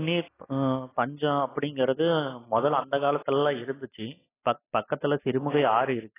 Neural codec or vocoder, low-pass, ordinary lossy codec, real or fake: none; 3.6 kHz; AAC, 16 kbps; real